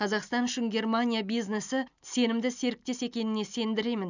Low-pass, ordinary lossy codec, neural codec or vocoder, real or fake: 7.2 kHz; none; none; real